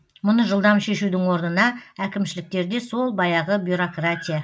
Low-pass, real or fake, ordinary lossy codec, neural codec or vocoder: none; real; none; none